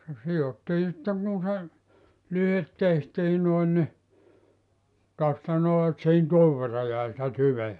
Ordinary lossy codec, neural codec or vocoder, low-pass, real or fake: none; none; 10.8 kHz; real